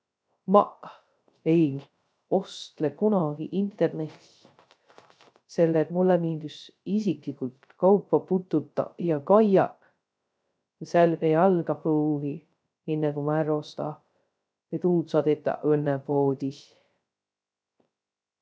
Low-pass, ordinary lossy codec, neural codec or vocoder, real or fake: none; none; codec, 16 kHz, 0.3 kbps, FocalCodec; fake